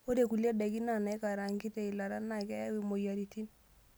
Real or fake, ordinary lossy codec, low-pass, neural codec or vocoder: real; none; none; none